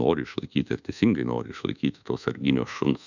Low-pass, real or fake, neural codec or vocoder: 7.2 kHz; fake; codec, 24 kHz, 1.2 kbps, DualCodec